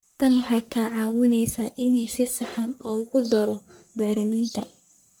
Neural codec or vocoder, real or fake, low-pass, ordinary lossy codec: codec, 44.1 kHz, 1.7 kbps, Pupu-Codec; fake; none; none